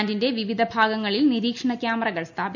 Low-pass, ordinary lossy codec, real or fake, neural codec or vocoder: 7.2 kHz; none; real; none